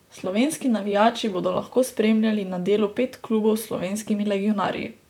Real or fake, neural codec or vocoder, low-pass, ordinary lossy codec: fake; vocoder, 44.1 kHz, 128 mel bands, Pupu-Vocoder; 19.8 kHz; none